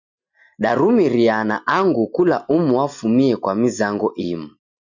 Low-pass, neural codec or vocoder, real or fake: 7.2 kHz; none; real